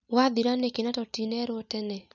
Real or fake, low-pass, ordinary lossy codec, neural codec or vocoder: real; 7.2 kHz; none; none